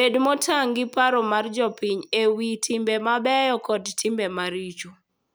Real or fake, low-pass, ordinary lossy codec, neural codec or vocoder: real; none; none; none